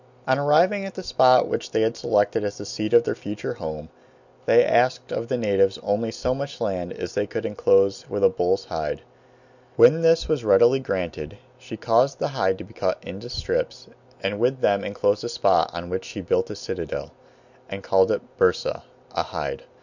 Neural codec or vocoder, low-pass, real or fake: none; 7.2 kHz; real